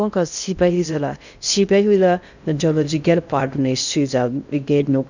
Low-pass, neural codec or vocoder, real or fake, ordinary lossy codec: 7.2 kHz; codec, 16 kHz in and 24 kHz out, 0.6 kbps, FocalCodec, streaming, 2048 codes; fake; none